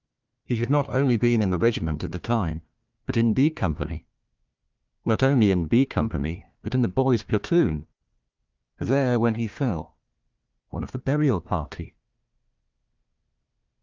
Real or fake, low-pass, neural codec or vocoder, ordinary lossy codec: fake; 7.2 kHz; codec, 16 kHz, 1 kbps, FunCodec, trained on Chinese and English, 50 frames a second; Opus, 24 kbps